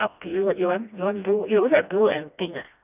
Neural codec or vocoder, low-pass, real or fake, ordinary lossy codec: codec, 16 kHz, 1 kbps, FreqCodec, smaller model; 3.6 kHz; fake; none